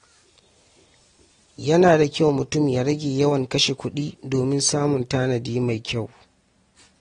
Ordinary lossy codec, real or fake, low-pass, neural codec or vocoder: AAC, 32 kbps; real; 9.9 kHz; none